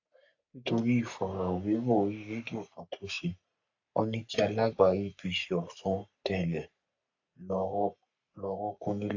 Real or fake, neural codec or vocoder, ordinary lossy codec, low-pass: fake; codec, 44.1 kHz, 3.4 kbps, Pupu-Codec; AAC, 48 kbps; 7.2 kHz